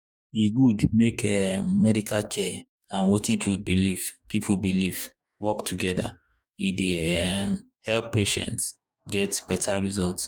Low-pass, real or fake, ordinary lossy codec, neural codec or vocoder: 19.8 kHz; fake; none; codec, 44.1 kHz, 2.6 kbps, DAC